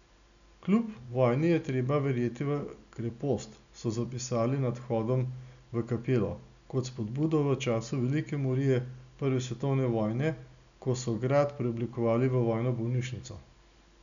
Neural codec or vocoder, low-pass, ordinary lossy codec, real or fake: none; 7.2 kHz; none; real